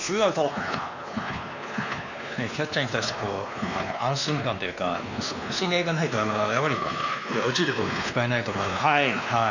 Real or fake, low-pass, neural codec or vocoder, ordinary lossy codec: fake; 7.2 kHz; codec, 16 kHz, 2 kbps, X-Codec, WavLM features, trained on Multilingual LibriSpeech; none